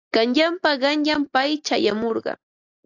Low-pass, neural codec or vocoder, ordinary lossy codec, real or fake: 7.2 kHz; none; AAC, 48 kbps; real